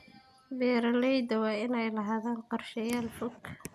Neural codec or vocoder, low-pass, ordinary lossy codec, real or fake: none; 14.4 kHz; none; real